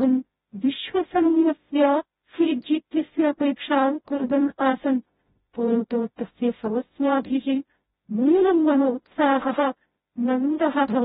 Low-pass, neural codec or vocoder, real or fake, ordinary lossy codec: 7.2 kHz; codec, 16 kHz, 0.5 kbps, FreqCodec, smaller model; fake; AAC, 16 kbps